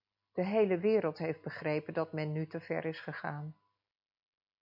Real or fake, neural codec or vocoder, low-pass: real; none; 5.4 kHz